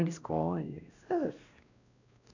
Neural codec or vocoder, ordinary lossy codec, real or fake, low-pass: codec, 16 kHz, 1 kbps, X-Codec, WavLM features, trained on Multilingual LibriSpeech; none; fake; 7.2 kHz